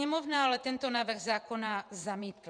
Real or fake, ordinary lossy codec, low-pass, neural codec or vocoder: fake; MP3, 96 kbps; 9.9 kHz; vocoder, 48 kHz, 128 mel bands, Vocos